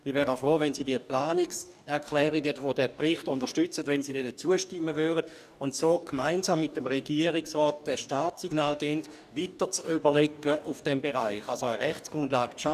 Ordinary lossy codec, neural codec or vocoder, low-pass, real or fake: none; codec, 44.1 kHz, 2.6 kbps, DAC; 14.4 kHz; fake